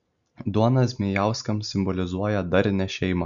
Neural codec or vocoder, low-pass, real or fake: none; 7.2 kHz; real